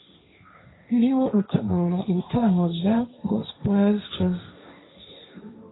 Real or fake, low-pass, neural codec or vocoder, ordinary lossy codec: fake; 7.2 kHz; codec, 16 kHz, 1.1 kbps, Voila-Tokenizer; AAC, 16 kbps